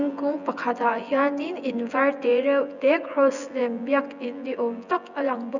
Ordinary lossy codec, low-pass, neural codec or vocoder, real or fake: none; 7.2 kHz; vocoder, 24 kHz, 100 mel bands, Vocos; fake